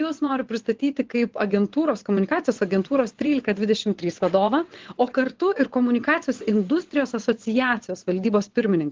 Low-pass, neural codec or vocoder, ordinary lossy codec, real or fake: 7.2 kHz; vocoder, 22.05 kHz, 80 mel bands, WaveNeXt; Opus, 16 kbps; fake